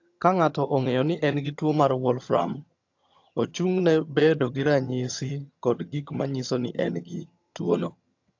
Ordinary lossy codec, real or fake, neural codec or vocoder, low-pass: AAC, 48 kbps; fake; vocoder, 22.05 kHz, 80 mel bands, HiFi-GAN; 7.2 kHz